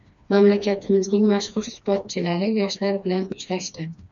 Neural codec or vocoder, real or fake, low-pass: codec, 16 kHz, 2 kbps, FreqCodec, smaller model; fake; 7.2 kHz